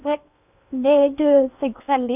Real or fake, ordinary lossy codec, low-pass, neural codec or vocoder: fake; none; 3.6 kHz; codec, 16 kHz in and 24 kHz out, 0.6 kbps, FocalCodec, streaming, 4096 codes